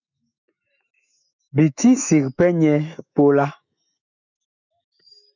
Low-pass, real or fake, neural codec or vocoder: 7.2 kHz; fake; autoencoder, 48 kHz, 128 numbers a frame, DAC-VAE, trained on Japanese speech